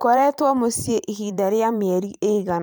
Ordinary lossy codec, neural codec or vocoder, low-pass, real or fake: none; vocoder, 44.1 kHz, 128 mel bands every 512 samples, BigVGAN v2; none; fake